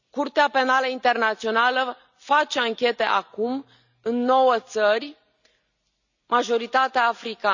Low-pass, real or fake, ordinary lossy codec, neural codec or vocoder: 7.2 kHz; real; none; none